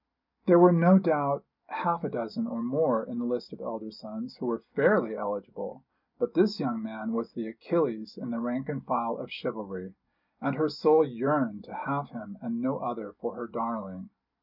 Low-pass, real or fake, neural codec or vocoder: 5.4 kHz; real; none